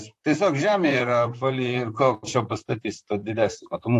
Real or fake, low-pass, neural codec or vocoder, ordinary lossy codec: fake; 14.4 kHz; vocoder, 44.1 kHz, 128 mel bands, Pupu-Vocoder; AAC, 64 kbps